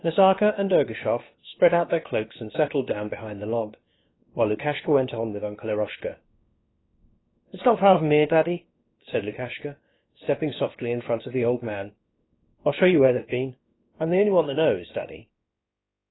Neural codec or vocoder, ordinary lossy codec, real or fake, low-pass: codec, 16 kHz, about 1 kbps, DyCAST, with the encoder's durations; AAC, 16 kbps; fake; 7.2 kHz